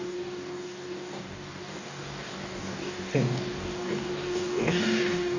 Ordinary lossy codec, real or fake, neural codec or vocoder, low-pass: none; fake; codec, 44.1 kHz, 2.6 kbps, DAC; 7.2 kHz